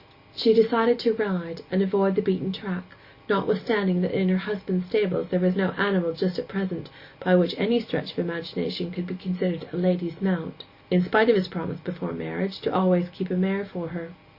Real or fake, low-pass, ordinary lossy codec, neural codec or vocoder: real; 5.4 kHz; Opus, 64 kbps; none